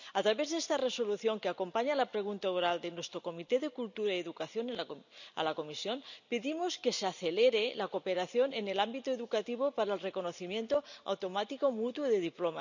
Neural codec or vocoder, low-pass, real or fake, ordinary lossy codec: none; 7.2 kHz; real; none